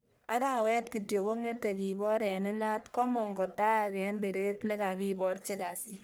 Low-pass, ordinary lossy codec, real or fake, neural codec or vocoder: none; none; fake; codec, 44.1 kHz, 1.7 kbps, Pupu-Codec